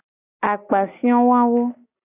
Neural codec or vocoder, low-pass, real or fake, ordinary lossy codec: none; 3.6 kHz; real; AAC, 24 kbps